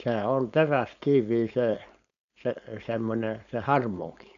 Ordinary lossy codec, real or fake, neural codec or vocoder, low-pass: none; fake; codec, 16 kHz, 4.8 kbps, FACodec; 7.2 kHz